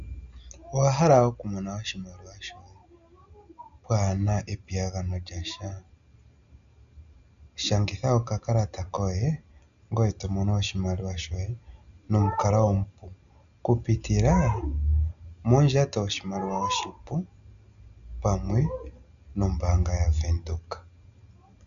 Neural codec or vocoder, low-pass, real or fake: none; 7.2 kHz; real